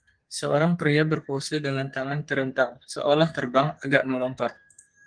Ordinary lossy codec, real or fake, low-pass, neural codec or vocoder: Opus, 24 kbps; fake; 9.9 kHz; codec, 32 kHz, 1.9 kbps, SNAC